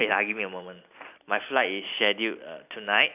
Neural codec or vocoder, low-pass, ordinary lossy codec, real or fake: none; 3.6 kHz; none; real